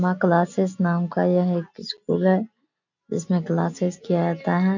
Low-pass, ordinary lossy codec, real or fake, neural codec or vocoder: 7.2 kHz; none; real; none